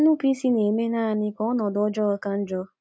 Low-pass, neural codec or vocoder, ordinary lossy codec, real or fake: none; none; none; real